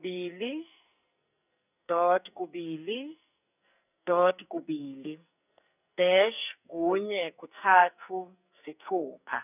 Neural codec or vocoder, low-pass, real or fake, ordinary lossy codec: codec, 44.1 kHz, 2.6 kbps, SNAC; 3.6 kHz; fake; none